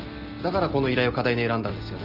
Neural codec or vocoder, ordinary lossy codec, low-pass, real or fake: none; Opus, 24 kbps; 5.4 kHz; real